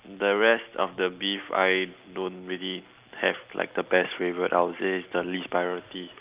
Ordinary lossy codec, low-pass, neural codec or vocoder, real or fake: Opus, 32 kbps; 3.6 kHz; none; real